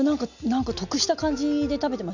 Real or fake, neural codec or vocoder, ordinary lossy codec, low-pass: real; none; none; 7.2 kHz